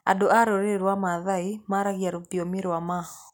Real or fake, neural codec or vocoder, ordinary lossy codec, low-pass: real; none; none; none